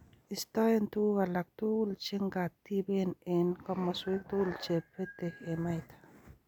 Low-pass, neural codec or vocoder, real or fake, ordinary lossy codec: 19.8 kHz; none; real; none